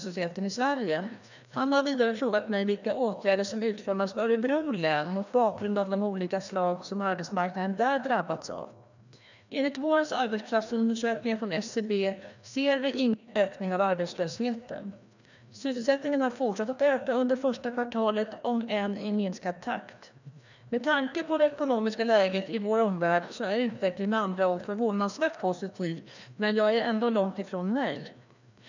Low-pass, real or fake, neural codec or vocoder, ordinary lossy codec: 7.2 kHz; fake; codec, 16 kHz, 1 kbps, FreqCodec, larger model; none